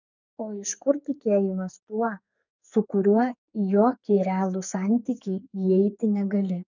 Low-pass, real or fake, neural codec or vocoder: 7.2 kHz; fake; codec, 44.1 kHz, 2.6 kbps, SNAC